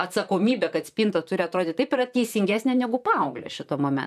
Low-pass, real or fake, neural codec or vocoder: 14.4 kHz; fake; vocoder, 44.1 kHz, 128 mel bands, Pupu-Vocoder